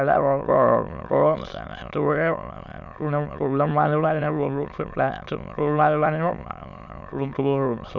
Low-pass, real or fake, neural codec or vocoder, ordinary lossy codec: 7.2 kHz; fake; autoencoder, 22.05 kHz, a latent of 192 numbers a frame, VITS, trained on many speakers; none